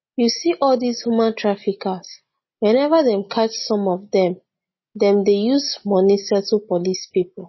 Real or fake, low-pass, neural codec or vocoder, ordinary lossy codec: real; 7.2 kHz; none; MP3, 24 kbps